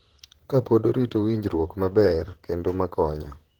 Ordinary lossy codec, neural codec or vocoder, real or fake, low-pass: Opus, 16 kbps; vocoder, 44.1 kHz, 128 mel bands, Pupu-Vocoder; fake; 19.8 kHz